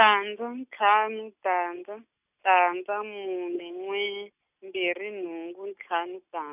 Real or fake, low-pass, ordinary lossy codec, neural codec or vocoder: real; 3.6 kHz; none; none